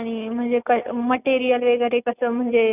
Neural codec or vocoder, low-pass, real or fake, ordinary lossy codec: none; 3.6 kHz; real; none